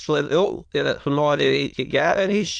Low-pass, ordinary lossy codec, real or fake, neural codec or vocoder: 9.9 kHz; AAC, 64 kbps; fake; autoencoder, 22.05 kHz, a latent of 192 numbers a frame, VITS, trained on many speakers